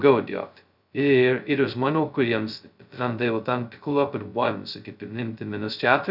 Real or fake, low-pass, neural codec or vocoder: fake; 5.4 kHz; codec, 16 kHz, 0.2 kbps, FocalCodec